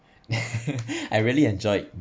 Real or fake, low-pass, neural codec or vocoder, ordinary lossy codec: real; none; none; none